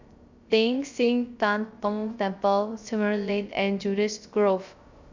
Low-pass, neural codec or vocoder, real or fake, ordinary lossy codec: 7.2 kHz; codec, 16 kHz, 0.3 kbps, FocalCodec; fake; Opus, 64 kbps